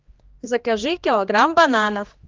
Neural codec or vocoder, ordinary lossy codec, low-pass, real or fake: codec, 16 kHz, 2 kbps, X-Codec, HuBERT features, trained on general audio; Opus, 32 kbps; 7.2 kHz; fake